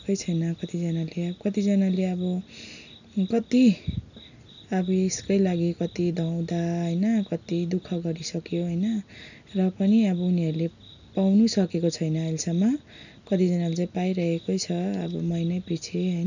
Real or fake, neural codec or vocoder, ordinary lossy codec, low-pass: real; none; none; 7.2 kHz